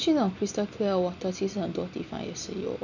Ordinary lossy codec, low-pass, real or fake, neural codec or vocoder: none; 7.2 kHz; real; none